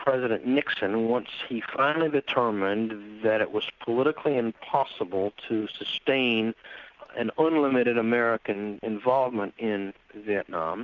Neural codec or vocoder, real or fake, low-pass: none; real; 7.2 kHz